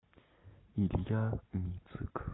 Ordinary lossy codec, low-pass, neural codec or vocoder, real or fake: AAC, 16 kbps; 7.2 kHz; none; real